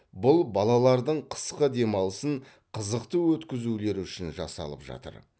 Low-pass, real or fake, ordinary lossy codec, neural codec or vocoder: none; real; none; none